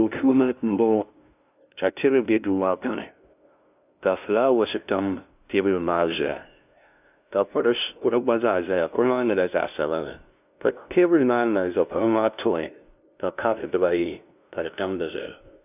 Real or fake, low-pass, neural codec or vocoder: fake; 3.6 kHz; codec, 16 kHz, 0.5 kbps, FunCodec, trained on LibriTTS, 25 frames a second